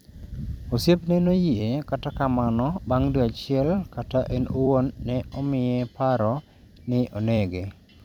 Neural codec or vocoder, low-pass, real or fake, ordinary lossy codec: vocoder, 44.1 kHz, 128 mel bands every 256 samples, BigVGAN v2; 19.8 kHz; fake; none